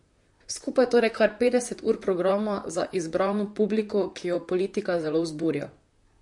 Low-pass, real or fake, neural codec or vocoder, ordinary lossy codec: 10.8 kHz; fake; vocoder, 44.1 kHz, 128 mel bands, Pupu-Vocoder; MP3, 48 kbps